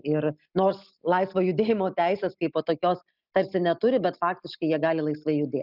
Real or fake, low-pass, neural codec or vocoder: real; 5.4 kHz; none